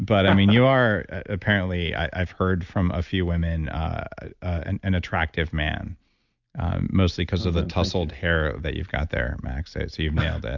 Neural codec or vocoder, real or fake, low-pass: none; real; 7.2 kHz